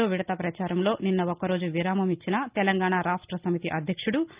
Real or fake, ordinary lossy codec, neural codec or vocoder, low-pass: real; Opus, 24 kbps; none; 3.6 kHz